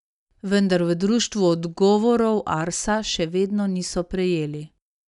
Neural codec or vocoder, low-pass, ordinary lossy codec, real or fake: none; 10.8 kHz; none; real